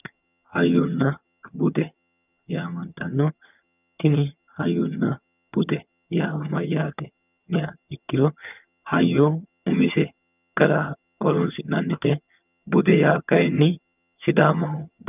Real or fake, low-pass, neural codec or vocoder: fake; 3.6 kHz; vocoder, 22.05 kHz, 80 mel bands, HiFi-GAN